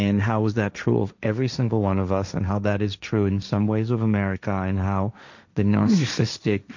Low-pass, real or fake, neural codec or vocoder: 7.2 kHz; fake; codec, 16 kHz, 1.1 kbps, Voila-Tokenizer